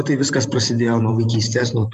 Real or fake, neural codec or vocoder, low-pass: fake; vocoder, 44.1 kHz, 128 mel bands every 512 samples, BigVGAN v2; 14.4 kHz